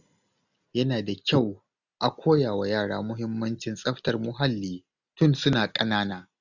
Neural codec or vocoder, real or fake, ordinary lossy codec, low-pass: none; real; none; 7.2 kHz